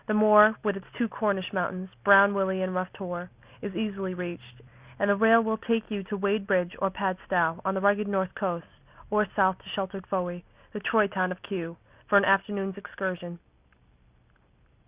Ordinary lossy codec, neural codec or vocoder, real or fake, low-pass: Opus, 64 kbps; none; real; 3.6 kHz